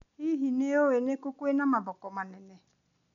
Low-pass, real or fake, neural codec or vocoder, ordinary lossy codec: 7.2 kHz; real; none; none